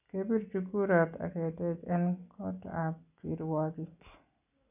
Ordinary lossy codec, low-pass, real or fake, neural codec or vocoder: none; 3.6 kHz; real; none